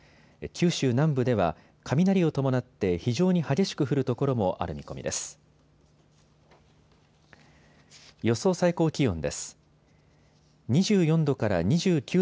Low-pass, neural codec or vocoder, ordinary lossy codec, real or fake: none; none; none; real